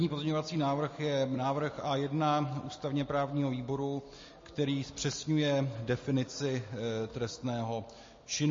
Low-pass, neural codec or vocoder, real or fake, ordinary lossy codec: 7.2 kHz; none; real; MP3, 32 kbps